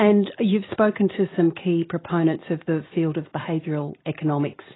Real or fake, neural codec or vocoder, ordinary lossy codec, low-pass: real; none; AAC, 16 kbps; 7.2 kHz